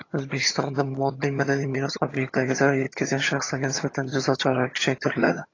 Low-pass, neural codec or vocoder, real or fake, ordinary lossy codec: 7.2 kHz; vocoder, 22.05 kHz, 80 mel bands, HiFi-GAN; fake; AAC, 32 kbps